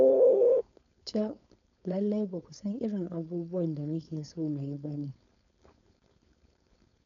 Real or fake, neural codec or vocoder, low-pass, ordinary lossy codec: fake; codec, 16 kHz, 4.8 kbps, FACodec; 7.2 kHz; none